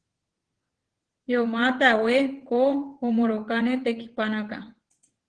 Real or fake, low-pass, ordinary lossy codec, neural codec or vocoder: fake; 9.9 kHz; Opus, 16 kbps; vocoder, 22.05 kHz, 80 mel bands, WaveNeXt